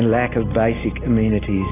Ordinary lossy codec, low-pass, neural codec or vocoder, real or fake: AAC, 16 kbps; 3.6 kHz; none; real